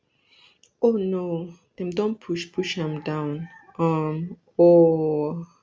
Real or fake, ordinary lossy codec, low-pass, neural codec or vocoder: real; none; none; none